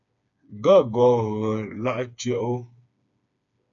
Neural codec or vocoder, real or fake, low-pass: codec, 16 kHz, 4 kbps, FreqCodec, smaller model; fake; 7.2 kHz